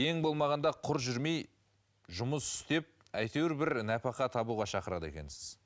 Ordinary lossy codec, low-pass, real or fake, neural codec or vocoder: none; none; real; none